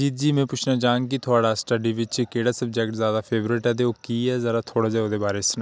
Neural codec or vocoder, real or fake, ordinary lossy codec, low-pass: none; real; none; none